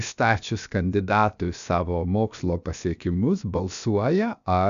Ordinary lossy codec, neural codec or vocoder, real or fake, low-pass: MP3, 96 kbps; codec, 16 kHz, about 1 kbps, DyCAST, with the encoder's durations; fake; 7.2 kHz